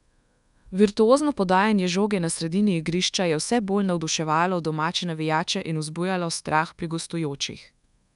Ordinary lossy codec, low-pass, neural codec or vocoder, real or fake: none; 10.8 kHz; codec, 24 kHz, 1.2 kbps, DualCodec; fake